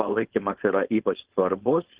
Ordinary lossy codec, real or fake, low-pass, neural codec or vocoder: Opus, 16 kbps; fake; 3.6 kHz; codec, 16 kHz, 4.8 kbps, FACodec